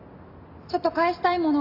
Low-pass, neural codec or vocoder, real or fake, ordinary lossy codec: 5.4 kHz; none; real; AAC, 48 kbps